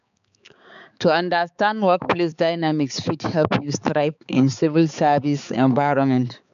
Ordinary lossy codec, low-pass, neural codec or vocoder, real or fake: none; 7.2 kHz; codec, 16 kHz, 4 kbps, X-Codec, HuBERT features, trained on balanced general audio; fake